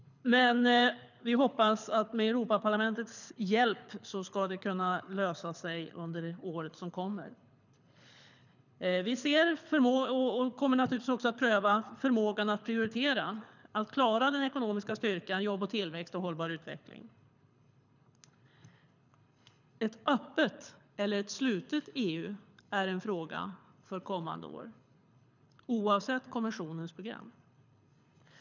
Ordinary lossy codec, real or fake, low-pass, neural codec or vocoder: none; fake; 7.2 kHz; codec, 24 kHz, 6 kbps, HILCodec